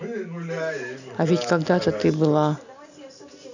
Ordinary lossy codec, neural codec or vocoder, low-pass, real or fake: none; none; 7.2 kHz; real